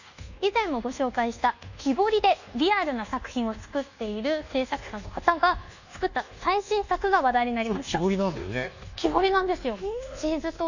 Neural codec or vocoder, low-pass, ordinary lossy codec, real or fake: codec, 24 kHz, 1.2 kbps, DualCodec; 7.2 kHz; none; fake